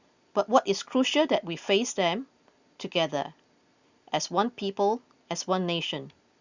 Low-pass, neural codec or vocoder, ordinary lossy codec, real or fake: 7.2 kHz; none; Opus, 64 kbps; real